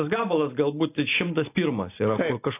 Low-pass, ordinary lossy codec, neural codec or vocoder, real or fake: 3.6 kHz; AAC, 24 kbps; none; real